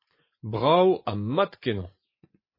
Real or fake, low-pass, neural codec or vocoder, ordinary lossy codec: fake; 5.4 kHz; vocoder, 24 kHz, 100 mel bands, Vocos; MP3, 24 kbps